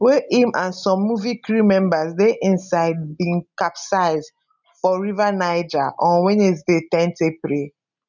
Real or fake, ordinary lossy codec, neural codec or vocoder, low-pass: real; none; none; 7.2 kHz